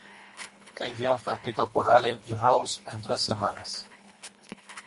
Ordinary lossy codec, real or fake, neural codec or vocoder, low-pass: MP3, 48 kbps; fake; codec, 24 kHz, 1.5 kbps, HILCodec; 10.8 kHz